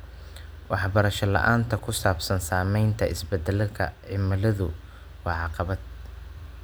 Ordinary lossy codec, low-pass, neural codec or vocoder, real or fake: none; none; none; real